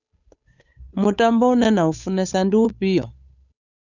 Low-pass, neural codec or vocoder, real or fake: 7.2 kHz; codec, 16 kHz, 8 kbps, FunCodec, trained on Chinese and English, 25 frames a second; fake